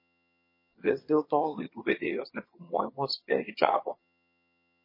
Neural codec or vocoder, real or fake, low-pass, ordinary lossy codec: vocoder, 22.05 kHz, 80 mel bands, HiFi-GAN; fake; 5.4 kHz; MP3, 24 kbps